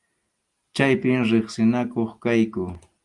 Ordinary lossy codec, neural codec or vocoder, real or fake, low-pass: Opus, 32 kbps; none; real; 10.8 kHz